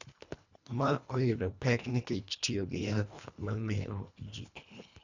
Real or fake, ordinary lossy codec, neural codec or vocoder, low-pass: fake; none; codec, 24 kHz, 1.5 kbps, HILCodec; 7.2 kHz